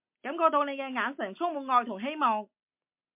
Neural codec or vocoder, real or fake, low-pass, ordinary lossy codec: none; real; 3.6 kHz; MP3, 32 kbps